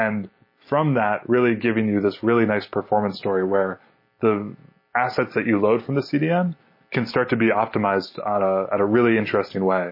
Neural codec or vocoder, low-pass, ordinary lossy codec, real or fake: none; 5.4 kHz; MP3, 24 kbps; real